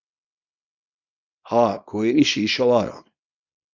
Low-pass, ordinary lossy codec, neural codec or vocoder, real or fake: 7.2 kHz; Opus, 64 kbps; codec, 24 kHz, 0.9 kbps, WavTokenizer, small release; fake